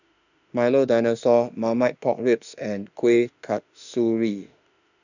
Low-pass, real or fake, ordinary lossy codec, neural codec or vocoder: 7.2 kHz; fake; none; autoencoder, 48 kHz, 32 numbers a frame, DAC-VAE, trained on Japanese speech